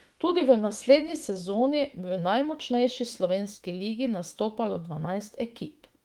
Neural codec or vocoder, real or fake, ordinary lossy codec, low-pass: autoencoder, 48 kHz, 32 numbers a frame, DAC-VAE, trained on Japanese speech; fake; Opus, 24 kbps; 19.8 kHz